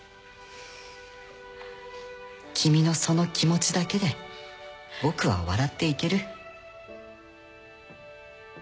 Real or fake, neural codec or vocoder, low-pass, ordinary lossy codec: real; none; none; none